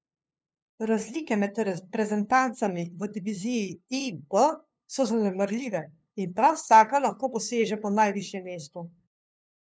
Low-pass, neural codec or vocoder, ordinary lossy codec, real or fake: none; codec, 16 kHz, 2 kbps, FunCodec, trained on LibriTTS, 25 frames a second; none; fake